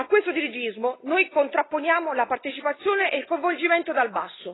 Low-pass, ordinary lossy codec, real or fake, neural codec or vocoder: 7.2 kHz; AAC, 16 kbps; real; none